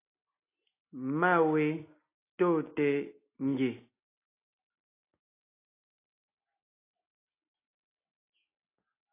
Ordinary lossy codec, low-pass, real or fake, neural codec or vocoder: AAC, 24 kbps; 3.6 kHz; real; none